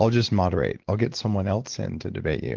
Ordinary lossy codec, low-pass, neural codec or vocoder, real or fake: Opus, 16 kbps; 7.2 kHz; none; real